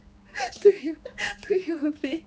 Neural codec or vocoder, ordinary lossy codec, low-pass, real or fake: codec, 16 kHz, 2 kbps, X-Codec, HuBERT features, trained on general audio; none; none; fake